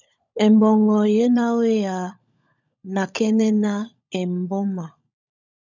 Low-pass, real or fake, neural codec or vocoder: 7.2 kHz; fake; codec, 16 kHz, 16 kbps, FunCodec, trained on LibriTTS, 50 frames a second